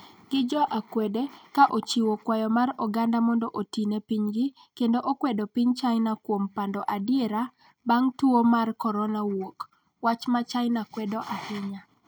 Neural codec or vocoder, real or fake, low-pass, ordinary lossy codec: none; real; none; none